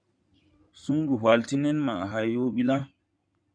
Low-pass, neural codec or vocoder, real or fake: 9.9 kHz; codec, 16 kHz in and 24 kHz out, 2.2 kbps, FireRedTTS-2 codec; fake